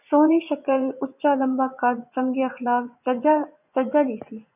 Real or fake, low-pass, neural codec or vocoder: fake; 3.6 kHz; vocoder, 24 kHz, 100 mel bands, Vocos